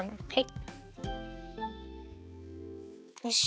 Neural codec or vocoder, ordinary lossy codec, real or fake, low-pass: codec, 16 kHz, 4 kbps, X-Codec, HuBERT features, trained on balanced general audio; none; fake; none